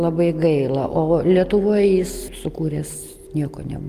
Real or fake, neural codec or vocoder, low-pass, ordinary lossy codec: real; none; 14.4 kHz; Opus, 32 kbps